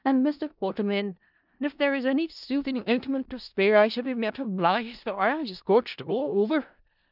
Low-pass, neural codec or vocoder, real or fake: 5.4 kHz; codec, 16 kHz in and 24 kHz out, 0.4 kbps, LongCat-Audio-Codec, four codebook decoder; fake